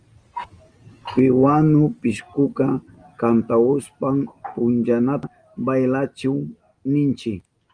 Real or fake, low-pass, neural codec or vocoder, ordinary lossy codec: real; 9.9 kHz; none; Opus, 32 kbps